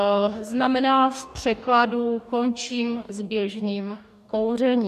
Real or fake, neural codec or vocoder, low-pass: fake; codec, 44.1 kHz, 2.6 kbps, DAC; 14.4 kHz